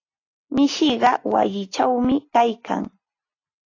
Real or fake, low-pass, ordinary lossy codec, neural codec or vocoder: real; 7.2 kHz; AAC, 32 kbps; none